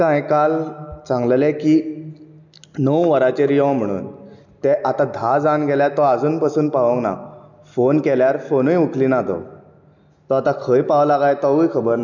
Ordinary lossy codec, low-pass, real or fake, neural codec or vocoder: none; 7.2 kHz; real; none